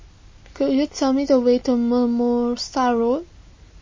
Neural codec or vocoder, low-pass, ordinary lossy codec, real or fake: none; 7.2 kHz; MP3, 32 kbps; real